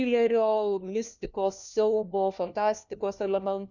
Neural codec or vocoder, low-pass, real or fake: codec, 16 kHz, 1 kbps, FunCodec, trained on LibriTTS, 50 frames a second; 7.2 kHz; fake